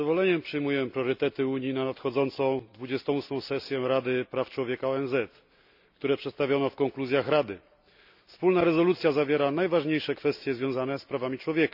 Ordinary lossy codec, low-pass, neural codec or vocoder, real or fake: none; 5.4 kHz; none; real